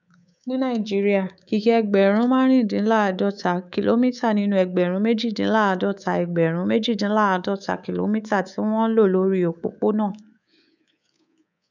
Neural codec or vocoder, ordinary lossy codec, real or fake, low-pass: codec, 24 kHz, 3.1 kbps, DualCodec; none; fake; 7.2 kHz